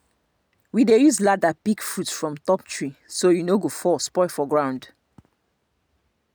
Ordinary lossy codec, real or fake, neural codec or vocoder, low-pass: none; real; none; none